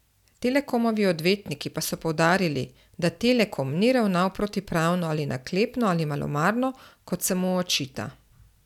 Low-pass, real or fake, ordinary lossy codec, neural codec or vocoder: 19.8 kHz; real; none; none